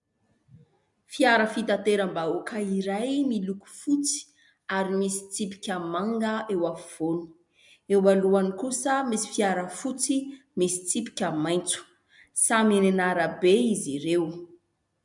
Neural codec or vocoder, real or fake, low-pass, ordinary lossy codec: none; real; 10.8 kHz; MP3, 96 kbps